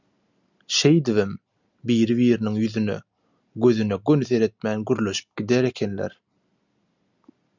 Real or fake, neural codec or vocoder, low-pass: real; none; 7.2 kHz